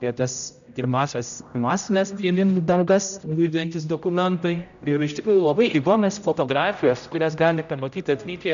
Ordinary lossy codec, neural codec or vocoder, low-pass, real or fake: AAC, 96 kbps; codec, 16 kHz, 0.5 kbps, X-Codec, HuBERT features, trained on general audio; 7.2 kHz; fake